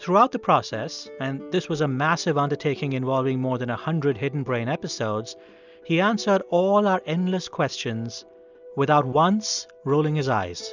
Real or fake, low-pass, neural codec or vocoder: real; 7.2 kHz; none